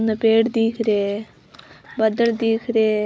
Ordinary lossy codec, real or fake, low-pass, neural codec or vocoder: none; real; none; none